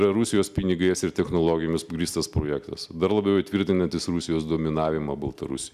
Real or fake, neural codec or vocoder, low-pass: real; none; 14.4 kHz